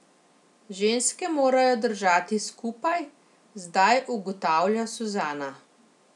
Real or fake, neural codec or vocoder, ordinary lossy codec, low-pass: real; none; none; 10.8 kHz